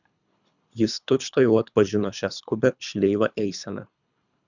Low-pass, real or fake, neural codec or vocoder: 7.2 kHz; fake; codec, 24 kHz, 3 kbps, HILCodec